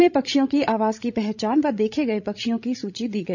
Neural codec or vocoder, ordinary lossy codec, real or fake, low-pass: codec, 16 kHz, 16 kbps, FreqCodec, larger model; none; fake; 7.2 kHz